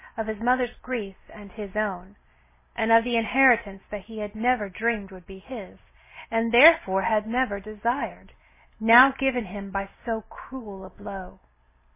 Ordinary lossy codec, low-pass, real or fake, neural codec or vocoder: MP3, 16 kbps; 3.6 kHz; real; none